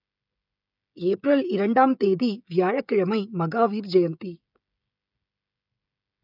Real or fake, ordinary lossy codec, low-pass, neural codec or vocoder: fake; none; 5.4 kHz; codec, 16 kHz, 16 kbps, FreqCodec, smaller model